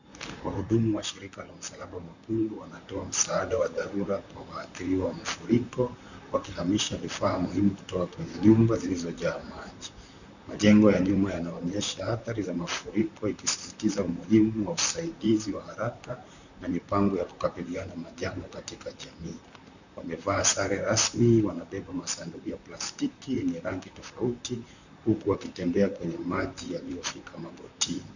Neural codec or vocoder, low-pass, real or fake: vocoder, 44.1 kHz, 128 mel bands, Pupu-Vocoder; 7.2 kHz; fake